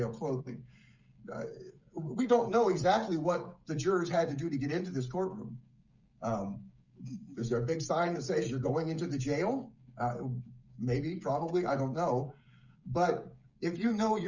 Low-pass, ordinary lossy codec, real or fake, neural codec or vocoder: 7.2 kHz; Opus, 64 kbps; fake; codec, 16 kHz, 16 kbps, FreqCodec, smaller model